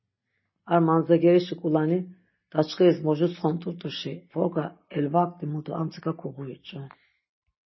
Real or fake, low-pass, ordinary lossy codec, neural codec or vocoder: real; 7.2 kHz; MP3, 24 kbps; none